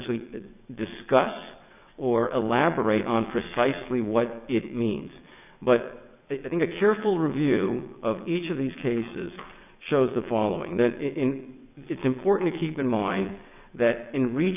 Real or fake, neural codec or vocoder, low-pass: fake; vocoder, 22.05 kHz, 80 mel bands, WaveNeXt; 3.6 kHz